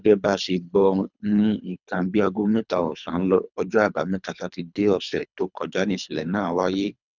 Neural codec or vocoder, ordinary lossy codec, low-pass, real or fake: codec, 24 kHz, 3 kbps, HILCodec; none; 7.2 kHz; fake